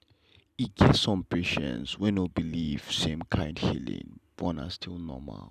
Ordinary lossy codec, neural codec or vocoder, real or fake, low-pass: none; none; real; 14.4 kHz